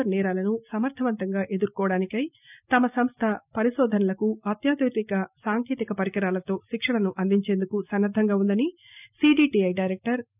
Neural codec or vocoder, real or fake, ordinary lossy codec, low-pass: none; real; none; 3.6 kHz